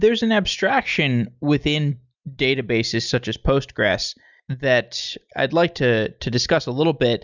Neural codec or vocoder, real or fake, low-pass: none; real; 7.2 kHz